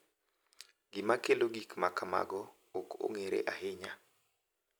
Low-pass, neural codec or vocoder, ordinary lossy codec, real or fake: none; none; none; real